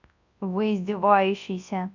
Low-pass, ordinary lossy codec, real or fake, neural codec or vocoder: 7.2 kHz; none; fake; codec, 24 kHz, 0.9 kbps, WavTokenizer, large speech release